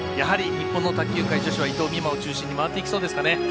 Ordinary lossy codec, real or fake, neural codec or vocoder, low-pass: none; real; none; none